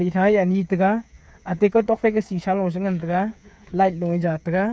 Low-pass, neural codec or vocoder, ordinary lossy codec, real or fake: none; codec, 16 kHz, 8 kbps, FreqCodec, smaller model; none; fake